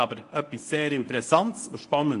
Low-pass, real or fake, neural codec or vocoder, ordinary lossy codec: 10.8 kHz; fake; codec, 24 kHz, 0.9 kbps, WavTokenizer, medium speech release version 1; AAC, 48 kbps